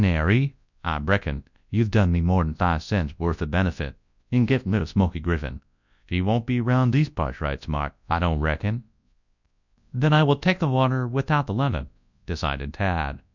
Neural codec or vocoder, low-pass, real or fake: codec, 24 kHz, 0.9 kbps, WavTokenizer, large speech release; 7.2 kHz; fake